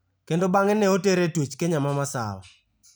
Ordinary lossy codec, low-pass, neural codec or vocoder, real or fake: none; none; none; real